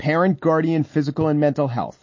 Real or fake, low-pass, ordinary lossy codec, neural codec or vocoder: real; 7.2 kHz; MP3, 32 kbps; none